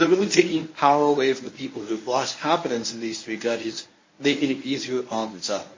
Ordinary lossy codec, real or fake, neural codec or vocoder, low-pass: MP3, 32 kbps; fake; codec, 24 kHz, 0.9 kbps, WavTokenizer, medium speech release version 1; 7.2 kHz